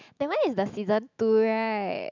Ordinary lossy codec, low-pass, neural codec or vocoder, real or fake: none; 7.2 kHz; autoencoder, 48 kHz, 128 numbers a frame, DAC-VAE, trained on Japanese speech; fake